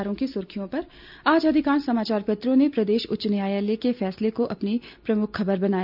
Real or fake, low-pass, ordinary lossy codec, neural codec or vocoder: real; 5.4 kHz; none; none